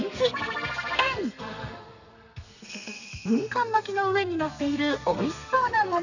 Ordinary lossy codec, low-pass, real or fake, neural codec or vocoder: none; 7.2 kHz; fake; codec, 32 kHz, 1.9 kbps, SNAC